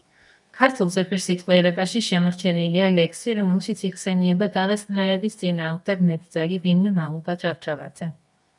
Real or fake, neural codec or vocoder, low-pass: fake; codec, 24 kHz, 0.9 kbps, WavTokenizer, medium music audio release; 10.8 kHz